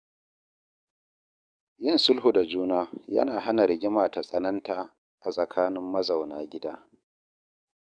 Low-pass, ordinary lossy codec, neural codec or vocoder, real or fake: 9.9 kHz; none; codec, 44.1 kHz, 7.8 kbps, DAC; fake